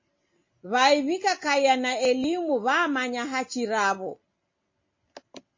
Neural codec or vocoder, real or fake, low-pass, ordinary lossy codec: none; real; 7.2 kHz; MP3, 32 kbps